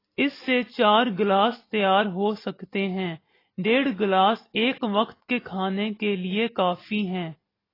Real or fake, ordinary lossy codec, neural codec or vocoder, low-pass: real; AAC, 24 kbps; none; 5.4 kHz